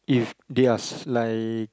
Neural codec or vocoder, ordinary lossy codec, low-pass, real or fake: none; none; none; real